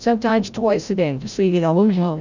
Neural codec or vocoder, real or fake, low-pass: codec, 16 kHz, 0.5 kbps, FreqCodec, larger model; fake; 7.2 kHz